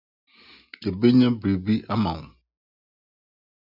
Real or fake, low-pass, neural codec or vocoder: real; 5.4 kHz; none